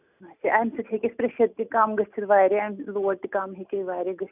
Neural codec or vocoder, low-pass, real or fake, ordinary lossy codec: none; 3.6 kHz; real; none